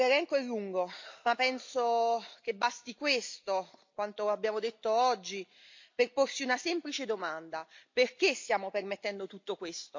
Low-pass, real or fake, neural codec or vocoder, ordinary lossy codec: 7.2 kHz; real; none; MP3, 48 kbps